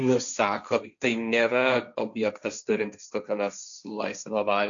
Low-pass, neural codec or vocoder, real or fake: 7.2 kHz; codec, 16 kHz, 1.1 kbps, Voila-Tokenizer; fake